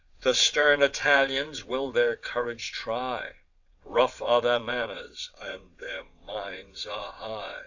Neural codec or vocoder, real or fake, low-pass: vocoder, 22.05 kHz, 80 mel bands, WaveNeXt; fake; 7.2 kHz